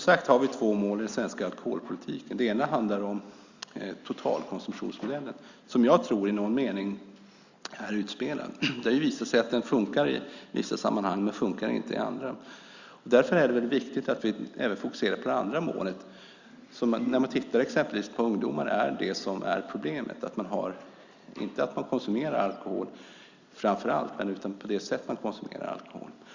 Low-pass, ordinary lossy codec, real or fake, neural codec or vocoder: 7.2 kHz; Opus, 64 kbps; real; none